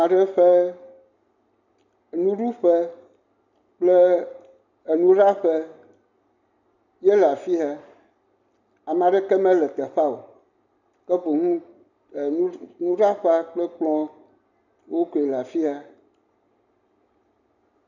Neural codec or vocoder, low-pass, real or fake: none; 7.2 kHz; real